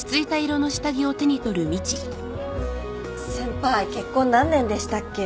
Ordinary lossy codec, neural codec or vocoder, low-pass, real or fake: none; none; none; real